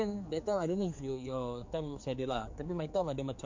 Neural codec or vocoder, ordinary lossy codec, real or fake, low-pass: codec, 16 kHz, 4 kbps, X-Codec, HuBERT features, trained on general audio; MP3, 48 kbps; fake; 7.2 kHz